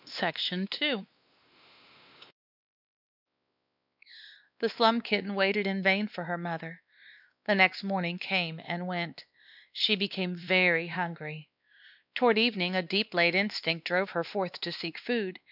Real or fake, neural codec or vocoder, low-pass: fake; codec, 16 kHz, 2 kbps, X-Codec, WavLM features, trained on Multilingual LibriSpeech; 5.4 kHz